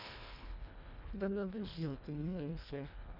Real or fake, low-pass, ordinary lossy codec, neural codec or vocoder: fake; 5.4 kHz; none; codec, 24 kHz, 1.5 kbps, HILCodec